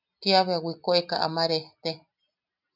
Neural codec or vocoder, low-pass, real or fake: none; 5.4 kHz; real